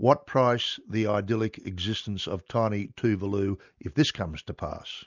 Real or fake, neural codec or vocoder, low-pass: real; none; 7.2 kHz